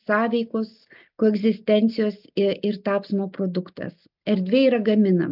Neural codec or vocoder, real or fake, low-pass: none; real; 5.4 kHz